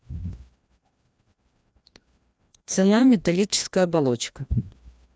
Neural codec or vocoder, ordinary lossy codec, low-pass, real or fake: codec, 16 kHz, 1 kbps, FreqCodec, larger model; none; none; fake